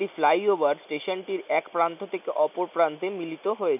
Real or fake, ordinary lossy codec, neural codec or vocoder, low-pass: real; none; none; 3.6 kHz